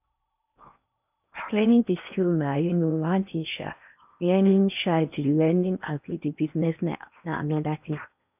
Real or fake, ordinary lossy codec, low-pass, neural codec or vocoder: fake; none; 3.6 kHz; codec, 16 kHz in and 24 kHz out, 0.8 kbps, FocalCodec, streaming, 65536 codes